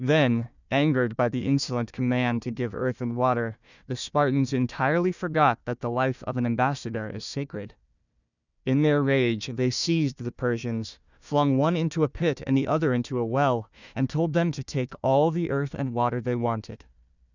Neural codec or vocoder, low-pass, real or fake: codec, 16 kHz, 1 kbps, FunCodec, trained on Chinese and English, 50 frames a second; 7.2 kHz; fake